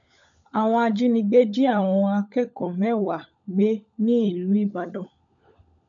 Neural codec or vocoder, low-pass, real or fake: codec, 16 kHz, 16 kbps, FunCodec, trained on LibriTTS, 50 frames a second; 7.2 kHz; fake